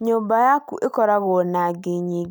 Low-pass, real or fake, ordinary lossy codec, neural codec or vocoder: none; real; none; none